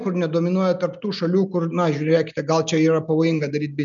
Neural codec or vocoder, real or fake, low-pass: none; real; 7.2 kHz